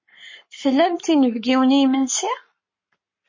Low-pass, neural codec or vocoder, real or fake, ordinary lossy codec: 7.2 kHz; codec, 44.1 kHz, 7.8 kbps, Pupu-Codec; fake; MP3, 32 kbps